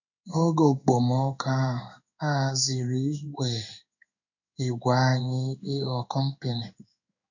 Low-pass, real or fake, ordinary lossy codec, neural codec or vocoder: 7.2 kHz; fake; none; codec, 16 kHz in and 24 kHz out, 1 kbps, XY-Tokenizer